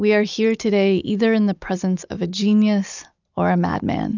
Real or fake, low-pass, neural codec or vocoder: real; 7.2 kHz; none